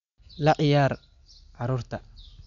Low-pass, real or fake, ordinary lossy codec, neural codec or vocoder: 7.2 kHz; real; none; none